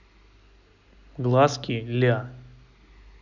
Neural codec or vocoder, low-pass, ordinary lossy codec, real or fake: none; 7.2 kHz; none; real